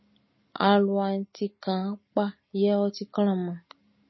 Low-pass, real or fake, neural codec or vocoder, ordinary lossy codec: 7.2 kHz; real; none; MP3, 24 kbps